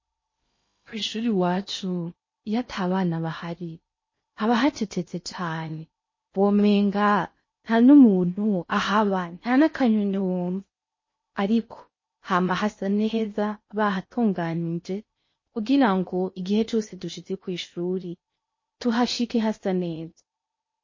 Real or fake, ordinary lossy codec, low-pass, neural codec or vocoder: fake; MP3, 32 kbps; 7.2 kHz; codec, 16 kHz in and 24 kHz out, 0.8 kbps, FocalCodec, streaming, 65536 codes